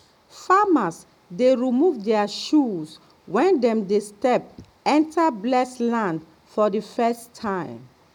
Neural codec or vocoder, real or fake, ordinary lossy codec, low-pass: none; real; none; 19.8 kHz